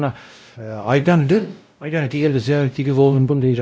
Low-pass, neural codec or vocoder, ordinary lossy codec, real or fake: none; codec, 16 kHz, 0.5 kbps, X-Codec, WavLM features, trained on Multilingual LibriSpeech; none; fake